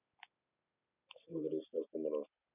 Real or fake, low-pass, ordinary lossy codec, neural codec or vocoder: real; 3.6 kHz; none; none